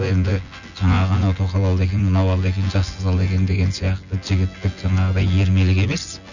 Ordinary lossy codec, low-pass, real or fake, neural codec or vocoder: none; 7.2 kHz; fake; vocoder, 24 kHz, 100 mel bands, Vocos